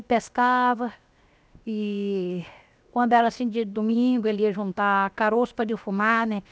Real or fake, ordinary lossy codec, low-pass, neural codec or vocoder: fake; none; none; codec, 16 kHz, 0.7 kbps, FocalCodec